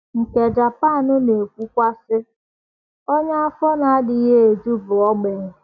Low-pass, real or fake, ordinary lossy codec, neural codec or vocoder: 7.2 kHz; real; none; none